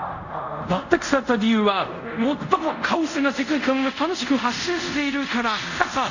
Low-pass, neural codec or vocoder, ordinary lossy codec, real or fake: 7.2 kHz; codec, 24 kHz, 0.5 kbps, DualCodec; none; fake